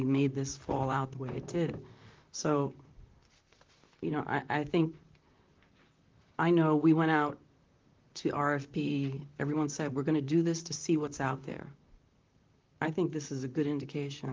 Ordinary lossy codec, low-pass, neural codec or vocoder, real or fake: Opus, 32 kbps; 7.2 kHz; vocoder, 44.1 kHz, 128 mel bands, Pupu-Vocoder; fake